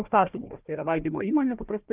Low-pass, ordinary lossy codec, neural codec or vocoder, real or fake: 3.6 kHz; Opus, 32 kbps; codec, 16 kHz, 1 kbps, FunCodec, trained on Chinese and English, 50 frames a second; fake